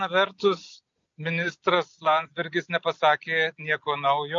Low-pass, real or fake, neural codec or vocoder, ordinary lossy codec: 7.2 kHz; real; none; MP3, 48 kbps